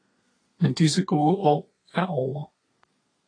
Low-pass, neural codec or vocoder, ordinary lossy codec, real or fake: 9.9 kHz; codec, 32 kHz, 1.9 kbps, SNAC; AAC, 32 kbps; fake